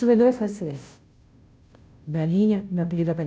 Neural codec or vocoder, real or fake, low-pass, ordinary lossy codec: codec, 16 kHz, 0.5 kbps, FunCodec, trained on Chinese and English, 25 frames a second; fake; none; none